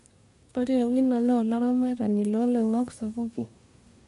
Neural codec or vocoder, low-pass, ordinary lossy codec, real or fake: codec, 24 kHz, 1 kbps, SNAC; 10.8 kHz; none; fake